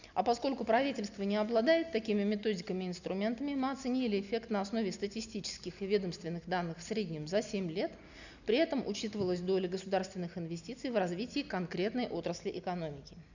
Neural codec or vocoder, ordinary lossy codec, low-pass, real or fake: none; none; 7.2 kHz; real